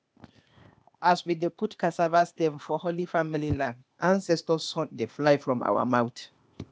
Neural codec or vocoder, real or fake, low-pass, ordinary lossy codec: codec, 16 kHz, 0.8 kbps, ZipCodec; fake; none; none